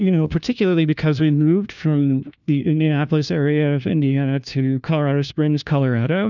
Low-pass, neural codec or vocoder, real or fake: 7.2 kHz; codec, 16 kHz, 1 kbps, FunCodec, trained on LibriTTS, 50 frames a second; fake